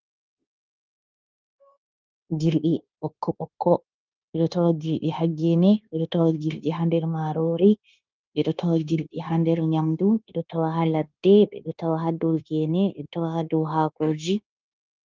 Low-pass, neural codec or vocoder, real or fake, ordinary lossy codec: 7.2 kHz; codec, 16 kHz, 0.9 kbps, LongCat-Audio-Codec; fake; Opus, 32 kbps